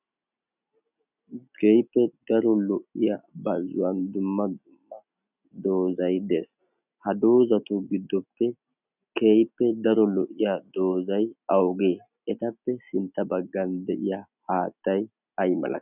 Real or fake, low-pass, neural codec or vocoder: real; 3.6 kHz; none